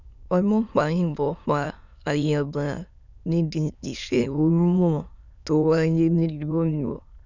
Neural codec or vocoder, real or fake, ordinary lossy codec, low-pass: autoencoder, 22.05 kHz, a latent of 192 numbers a frame, VITS, trained on many speakers; fake; none; 7.2 kHz